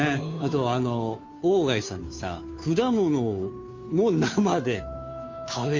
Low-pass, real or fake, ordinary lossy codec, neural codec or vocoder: 7.2 kHz; fake; MP3, 48 kbps; codec, 16 kHz, 2 kbps, FunCodec, trained on Chinese and English, 25 frames a second